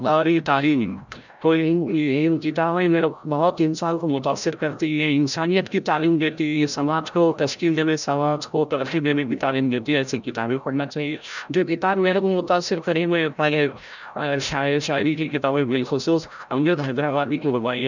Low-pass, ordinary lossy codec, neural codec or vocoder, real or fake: 7.2 kHz; none; codec, 16 kHz, 0.5 kbps, FreqCodec, larger model; fake